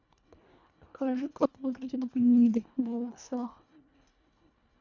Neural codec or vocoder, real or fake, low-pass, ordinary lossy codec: codec, 24 kHz, 1.5 kbps, HILCodec; fake; 7.2 kHz; none